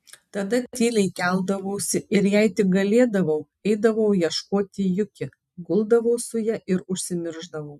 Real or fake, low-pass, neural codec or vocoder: real; 14.4 kHz; none